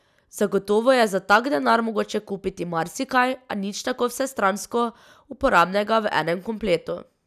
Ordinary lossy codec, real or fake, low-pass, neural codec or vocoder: none; real; 14.4 kHz; none